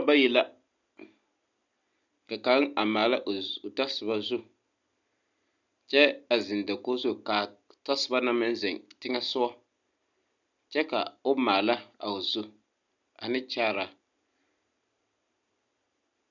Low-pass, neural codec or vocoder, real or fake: 7.2 kHz; none; real